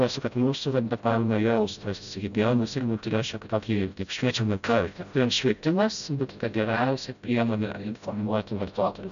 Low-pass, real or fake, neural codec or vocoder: 7.2 kHz; fake; codec, 16 kHz, 0.5 kbps, FreqCodec, smaller model